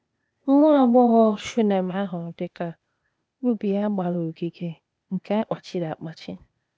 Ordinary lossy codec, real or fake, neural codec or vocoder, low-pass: none; fake; codec, 16 kHz, 0.8 kbps, ZipCodec; none